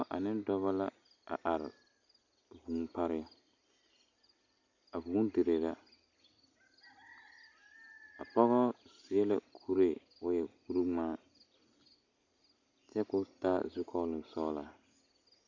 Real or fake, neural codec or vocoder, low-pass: real; none; 7.2 kHz